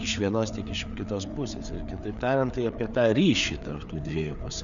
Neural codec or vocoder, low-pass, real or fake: codec, 16 kHz, 4 kbps, FreqCodec, larger model; 7.2 kHz; fake